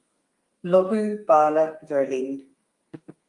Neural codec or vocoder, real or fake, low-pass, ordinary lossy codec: codec, 32 kHz, 1.9 kbps, SNAC; fake; 10.8 kHz; Opus, 32 kbps